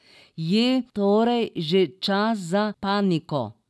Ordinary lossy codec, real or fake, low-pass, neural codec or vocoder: none; real; none; none